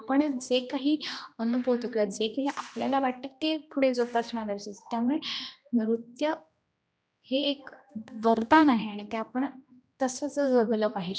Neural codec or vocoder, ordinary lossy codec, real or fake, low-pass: codec, 16 kHz, 1 kbps, X-Codec, HuBERT features, trained on general audio; none; fake; none